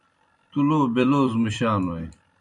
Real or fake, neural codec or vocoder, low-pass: fake; vocoder, 44.1 kHz, 128 mel bands every 256 samples, BigVGAN v2; 10.8 kHz